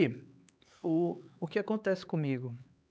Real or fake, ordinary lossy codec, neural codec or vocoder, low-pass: fake; none; codec, 16 kHz, 4 kbps, X-Codec, HuBERT features, trained on LibriSpeech; none